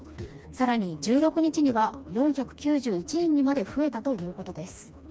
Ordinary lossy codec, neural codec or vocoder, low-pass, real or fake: none; codec, 16 kHz, 2 kbps, FreqCodec, smaller model; none; fake